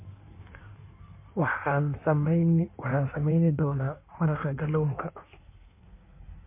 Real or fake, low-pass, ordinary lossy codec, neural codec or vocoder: fake; 3.6 kHz; MP3, 24 kbps; codec, 16 kHz in and 24 kHz out, 1.1 kbps, FireRedTTS-2 codec